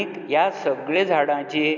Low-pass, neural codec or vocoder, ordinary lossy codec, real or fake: 7.2 kHz; none; none; real